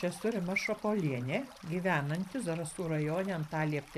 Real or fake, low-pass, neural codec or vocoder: fake; 14.4 kHz; vocoder, 44.1 kHz, 128 mel bands every 512 samples, BigVGAN v2